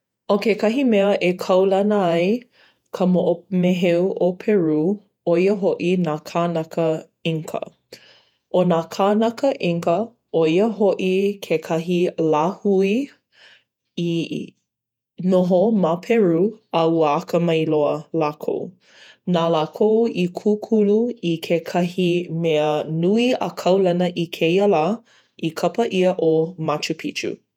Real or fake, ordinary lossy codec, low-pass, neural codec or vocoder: fake; none; 19.8 kHz; vocoder, 48 kHz, 128 mel bands, Vocos